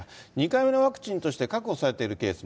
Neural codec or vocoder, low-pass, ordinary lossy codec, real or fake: none; none; none; real